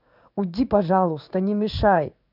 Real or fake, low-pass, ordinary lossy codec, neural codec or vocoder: fake; 5.4 kHz; none; codec, 16 kHz in and 24 kHz out, 1 kbps, XY-Tokenizer